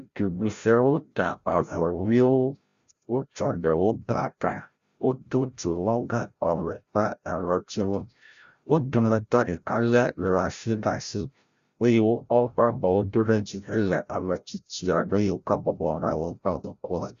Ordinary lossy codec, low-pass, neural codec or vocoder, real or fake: Opus, 64 kbps; 7.2 kHz; codec, 16 kHz, 0.5 kbps, FreqCodec, larger model; fake